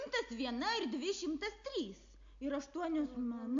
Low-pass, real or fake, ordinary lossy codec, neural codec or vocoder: 7.2 kHz; real; AAC, 64 kbps; none